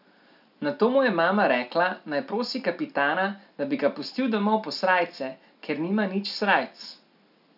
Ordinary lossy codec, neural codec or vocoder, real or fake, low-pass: none; none; real; 5.4 kHz